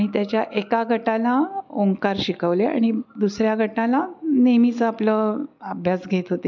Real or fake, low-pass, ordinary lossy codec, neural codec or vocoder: real; 7.2 kHz; MP3, 64 kbps; none